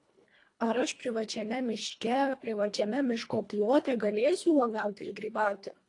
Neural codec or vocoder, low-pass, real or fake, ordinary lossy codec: codec, 24 kHz, 1.5 kbps, HILCodec; 10.8 kHz; fake; AAC, 48 kbps